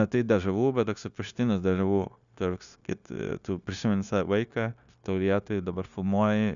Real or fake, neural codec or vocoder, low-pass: fake; codec, 16 kHz, 0.9 kbps, LongCat-Audio-Codec; 7.2 kHz